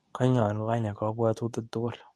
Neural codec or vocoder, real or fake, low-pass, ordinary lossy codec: codec, 24 kHz, 0.9 kbps, WavTokenizer, medium speech release version 2; fake; none; none